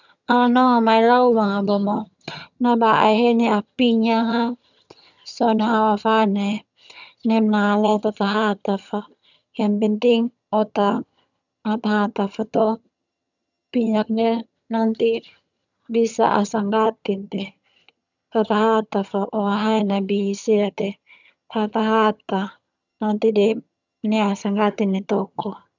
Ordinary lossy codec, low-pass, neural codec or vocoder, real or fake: none; 7.2 kHz; vocoder, 22.05 kHz, 80 mel bands, HiFi-GAN; fake